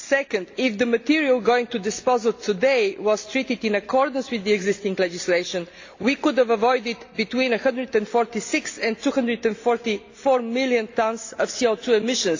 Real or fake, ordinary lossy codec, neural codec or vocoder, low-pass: real; AAC, 48 kbps; none; 7.2 kHz